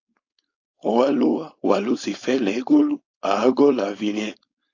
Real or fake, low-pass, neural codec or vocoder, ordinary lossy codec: fake; 7.2 kHz; codec, 16 kHz, 4.8 kbps, FACodec; AAC, 48 kbps